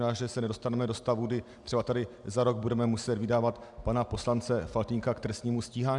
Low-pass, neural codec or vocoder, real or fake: 10.8 kHz; none; real